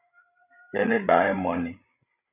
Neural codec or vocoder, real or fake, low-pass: codec, 16 kHz, 16 kbps, FreqCodec, larger model; fake; 3.6 kHz